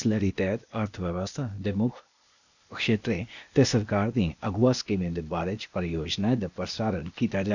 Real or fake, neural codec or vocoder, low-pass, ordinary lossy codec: fake; codec, 16 kHz, 0.8 kbps, ZipCodec; 7.2 kHz; none